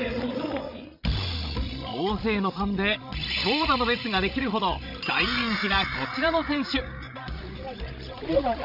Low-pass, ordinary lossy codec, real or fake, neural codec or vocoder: 5.4 kHz; none; fake; codec, 16 kHz, 16 kbps, FreqCodec, larger model